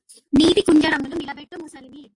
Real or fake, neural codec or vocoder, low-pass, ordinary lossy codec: real; none; 10.8 kHz; AAC, 48 kbps